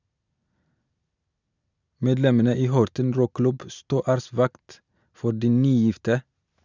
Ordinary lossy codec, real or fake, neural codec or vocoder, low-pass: none; real; none; 7.2 kHz